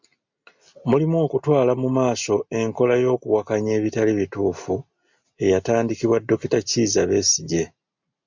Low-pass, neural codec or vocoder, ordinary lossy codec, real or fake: 7.2 kHz; none; MP3, 64 kbps; real